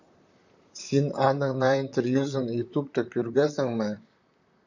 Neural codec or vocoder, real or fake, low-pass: vocoder, 44.1 kHz, 128 mel bands, Pupu-Vocoder; fake; 7.2 kHz